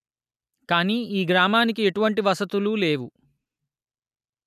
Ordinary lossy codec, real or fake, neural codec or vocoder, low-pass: none; real; none; 14.4 kHz